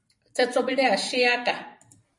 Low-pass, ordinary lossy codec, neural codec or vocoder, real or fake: 10.8 kHz; MP3, 48 kbps; vocoder, 44.1 kHz, 128 mel bands every 256 samples, BigVGAN v2; fake